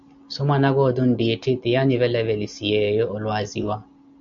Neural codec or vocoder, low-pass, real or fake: none; 7.2 kHz; real